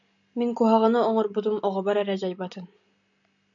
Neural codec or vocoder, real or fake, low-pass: none; real; 7.2 kHz